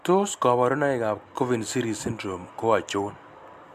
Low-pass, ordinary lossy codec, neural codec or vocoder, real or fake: 14.4 kHz; MP3, 96 kbps; none; real